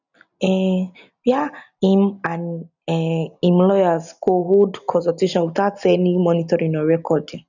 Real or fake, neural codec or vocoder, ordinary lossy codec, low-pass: real; none; none; 7.2 kHz